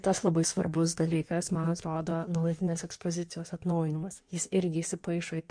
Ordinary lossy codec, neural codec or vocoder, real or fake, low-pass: MP3, 64 kbps; codec, 16 kHz in and 24 kHz out, 1.1 kbps, FireRedTTS-2 codec; fake; 9.9 kHz